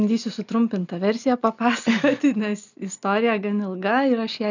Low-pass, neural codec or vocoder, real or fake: 7.2 kHz; none; real